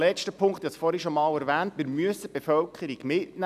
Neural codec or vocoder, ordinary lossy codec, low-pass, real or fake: none; none; 14.4 kHz; real